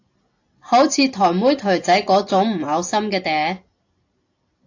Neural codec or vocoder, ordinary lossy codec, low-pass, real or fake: none; AAC, 48 kbps; 7.2 kHz; real